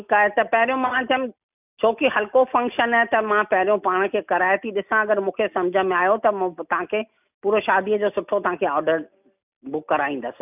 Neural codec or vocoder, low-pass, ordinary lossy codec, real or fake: none; 3.6 kHz; none; real